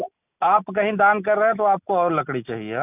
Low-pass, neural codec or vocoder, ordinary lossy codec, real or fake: 3.6 kHz; none; none; real